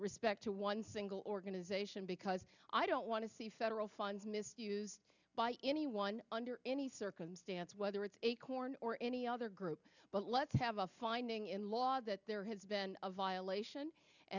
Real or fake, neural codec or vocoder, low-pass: real; none; 7.2 kHz